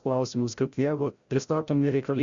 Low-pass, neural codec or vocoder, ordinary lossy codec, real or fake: 7.2 kHz; codec, 16 kHz, 0.5 kbps, FreqCodec, larger model; Opus, 64 kbps; fake